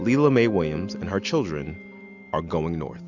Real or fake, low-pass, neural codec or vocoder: real; 7.2 kHz; none